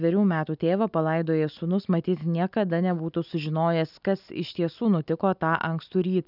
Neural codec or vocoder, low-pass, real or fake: none; 5.4 kHz; real